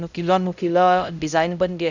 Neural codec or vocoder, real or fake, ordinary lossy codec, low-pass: codec, 16 kHz, 0.5 kbps, X-Codec, HuBERT features, trained on LibriSpeech; fake; none; 7.2 kHz